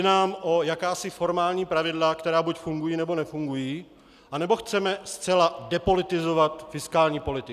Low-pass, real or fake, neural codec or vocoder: 14.4 kHz; real; none